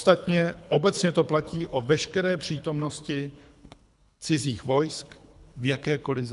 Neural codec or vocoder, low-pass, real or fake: codec, 24 kHz, 3 kbps, HILCodec; 10.8 kHz; fake